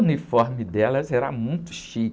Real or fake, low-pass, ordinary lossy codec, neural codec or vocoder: real; none; none; none